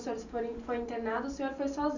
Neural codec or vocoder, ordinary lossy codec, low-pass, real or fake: none; none; 7.2 kHz; real